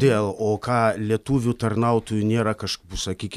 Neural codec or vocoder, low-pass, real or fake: none; 14.4 kHz; real